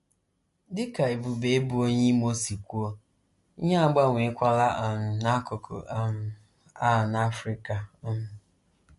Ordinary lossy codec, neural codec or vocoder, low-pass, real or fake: MP3, 48 kbps; none; 14.4 kHz; real